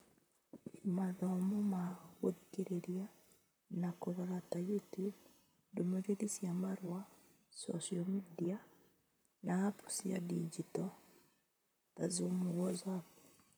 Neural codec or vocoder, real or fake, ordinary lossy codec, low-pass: vocoder, 44.1 kHz, 128 mel bands, Pupu-Vocoder; fake; none; none